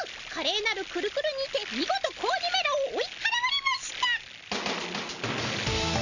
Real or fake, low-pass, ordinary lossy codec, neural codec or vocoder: real; 7.2 kHz; none; none